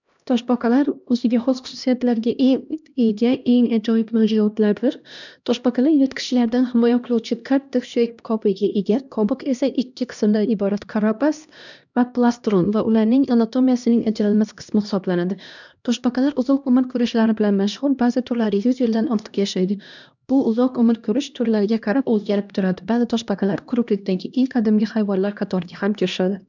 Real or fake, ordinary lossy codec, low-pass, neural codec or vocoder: fake; none; 7.2 kHz; codec, 16 kHz, 1 kbps, X-Codec, HuBERT features, trained on LibriSpeech